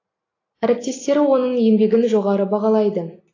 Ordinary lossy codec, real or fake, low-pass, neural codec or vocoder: AAC, 32 kbps; real; 7.2 kHz; none